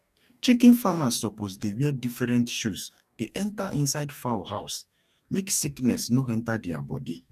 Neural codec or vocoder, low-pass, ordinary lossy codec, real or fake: codec, 44.1 kHz, 2.6 kbps, DAC; 14.4 kHz; none; fake